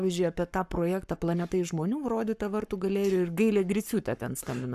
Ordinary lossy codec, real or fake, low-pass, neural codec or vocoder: AAC, 96 kbps; fake; 14.4 kHz; codec, 44.1 kHz, 7.8 kbps, Pupu-Codec